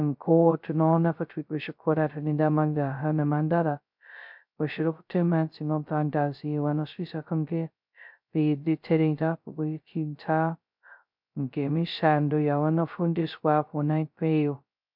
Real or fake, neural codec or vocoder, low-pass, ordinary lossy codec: fake; codec, 16 kHz, 0.2 kbps, FocalCodec; 5.4 kHz; MP3, 48 kbps